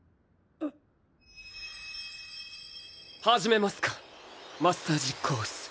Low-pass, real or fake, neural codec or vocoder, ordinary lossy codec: none; real; none; none